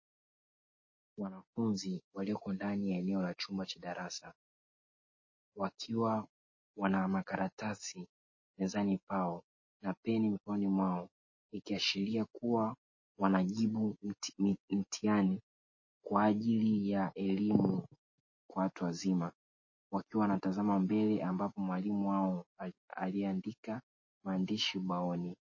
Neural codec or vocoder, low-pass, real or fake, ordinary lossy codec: none; 7.2 kHz; real; MP3, 32 kbps